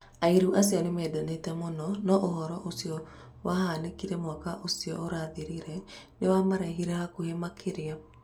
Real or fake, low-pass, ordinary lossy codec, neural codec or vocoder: real; 19.8 kHz; none; none